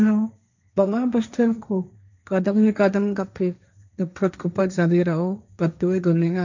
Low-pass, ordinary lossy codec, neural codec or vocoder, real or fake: 7.2 kHz; none; codec, 16 kHz, 1.1 kbps, Voila-Tokenizer; fake